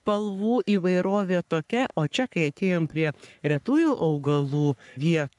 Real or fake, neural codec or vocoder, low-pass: fake; codec, 44.1 kHz, 3.4 kbps, Pupu-Codec; 10.8 kHz